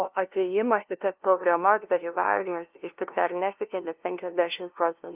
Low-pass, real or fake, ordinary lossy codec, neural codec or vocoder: 3.6 kHz; fake; Opus, 32 kbps; codec, 16 kHz, 0.5 kbps, FunCodec, trained on LibriTTS, 25 frames a second